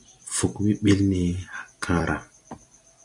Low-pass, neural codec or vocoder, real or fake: 10.8 kHz; none; real